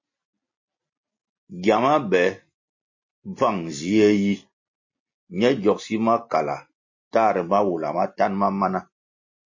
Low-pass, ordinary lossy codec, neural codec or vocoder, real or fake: 7.2 kHz; MP3, 32 kbps; none; real